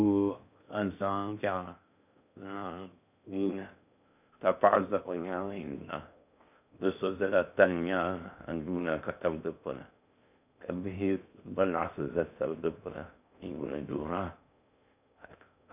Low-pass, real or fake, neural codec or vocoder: 3.6 kHz; fake; codec, 16 kHz in and 24 kHz out, 0.6 kbps, FocalCodec, streaming, 2048 codes